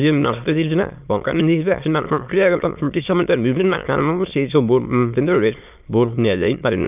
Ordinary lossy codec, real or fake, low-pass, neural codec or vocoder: none; fake; 3.6 kHz; autoencoder, 22.05 kHz, a latent of 192 numbers a frame, VITS, trained on many speakers